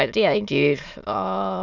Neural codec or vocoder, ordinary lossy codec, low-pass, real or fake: autoencoder, 22.05 kHz, a latent of 192 numbers a frame, VITS, trained on many speakers; none; 7.2 kHz; fake